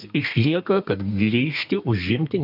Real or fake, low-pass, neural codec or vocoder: fake; 5.4 kHz; codec, 32 kHz, 1.9 kbps, SNAC